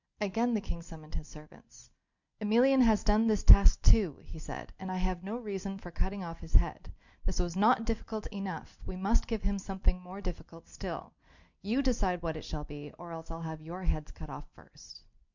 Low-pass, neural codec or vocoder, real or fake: 7.2 kHz; none; real